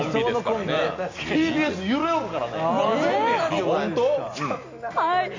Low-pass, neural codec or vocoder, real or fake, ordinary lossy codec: 7.2 kHz; none; real; MP3, 64 kbps